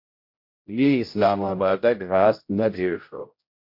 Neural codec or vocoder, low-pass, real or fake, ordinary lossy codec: codec, 16 kHz, 0.5 kbps, X-Codec, HuBERT features, trained on general audio; 5.4 kHz; fake; MP3, 32 kbps